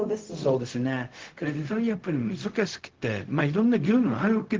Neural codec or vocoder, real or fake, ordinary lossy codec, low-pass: codec, 16 kHz, 0.4 kbps, LongCat-Audio-Codec; fake; Opus, 16 kbps; 7.2 kHz